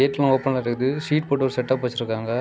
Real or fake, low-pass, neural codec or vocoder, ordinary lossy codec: real; none; none; none